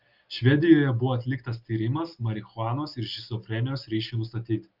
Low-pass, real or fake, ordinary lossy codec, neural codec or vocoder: 5.4 kHz; real; Opus, 32 kbps; none